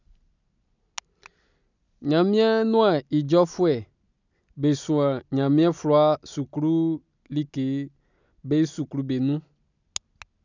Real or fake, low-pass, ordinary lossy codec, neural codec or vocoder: real; 7.2 kHz; none; none